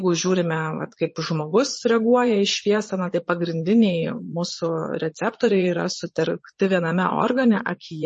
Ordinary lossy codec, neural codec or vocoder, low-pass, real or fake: MP3, 32 kbps; vocoder, 22.05 kHz, 80 mel bands, Vocos; 9.9 kHz; fake